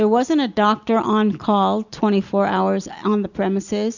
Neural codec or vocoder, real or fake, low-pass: none; real; 7.2 kHz